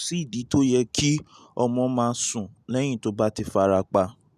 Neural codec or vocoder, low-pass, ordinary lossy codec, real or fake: none; 14.4 kHz; none; real